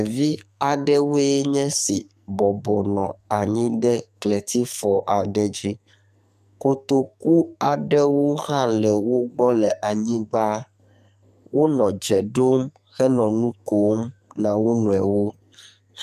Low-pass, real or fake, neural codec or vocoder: 14.4 kHz; fake; codec, 44.1 kHz, 2.6 kbps, SNAC